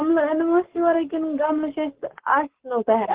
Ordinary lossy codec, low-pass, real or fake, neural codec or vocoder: Opus, 16 kbps; 3.6 kHz; fake; codec, 44.1 kHz, 7.8 kbps, Pupu-Codec